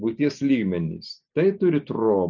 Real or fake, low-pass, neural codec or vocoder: real; 7.2 kHz; none